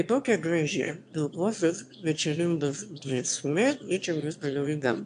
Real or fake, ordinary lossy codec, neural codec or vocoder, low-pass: fake; AAC, 64 kbps; autoencoder, 22.05 kHz, a latent of 192 numbers a frame, VITS, trained on one speaker; 9.9 kHz